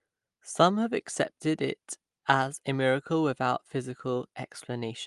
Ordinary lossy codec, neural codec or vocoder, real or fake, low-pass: Opus, 32 kbps; none; real; 10.8 kHz